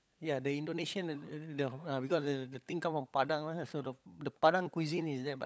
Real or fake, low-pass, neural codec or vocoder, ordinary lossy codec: fake; none; codec, 16 kHz, 16 kbps, FunCodec, trained on LibriTTS, 50 frames a second; none